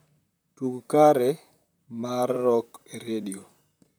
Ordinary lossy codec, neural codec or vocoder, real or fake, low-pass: none; vocoder, 44.1 kHz, 128 mel bands, Pupu-Vocoder; fake; none